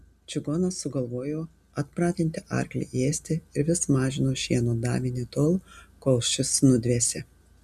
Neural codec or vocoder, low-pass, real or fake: none; 14.4 kHz; real